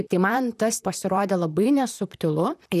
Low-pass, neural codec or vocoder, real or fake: 14.4 kHz; vocoder, 44.1 kHz, 128 mel bands, Pupu-Vocoder; fake